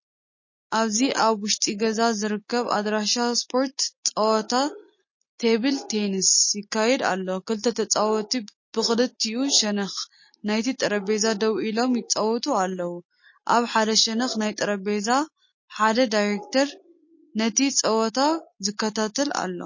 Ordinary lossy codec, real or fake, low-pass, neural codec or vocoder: MP3, 32 kbps; real; 7.2 kHz; none